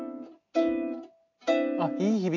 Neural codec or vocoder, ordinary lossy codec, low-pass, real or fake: none; AAC, 48 kbps; 7.2 kHz; real